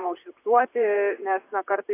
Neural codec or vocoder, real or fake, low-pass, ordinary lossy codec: vocoder, 44.1 kHz, 128 mel bands, Pupu-Vocoder; fake; 3.6 kHz; AAC, 24 kbps